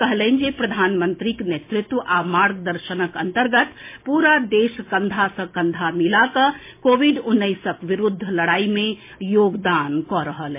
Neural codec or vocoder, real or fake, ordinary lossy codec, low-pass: none; real; MP3, 24 kbps; 3.6 kHz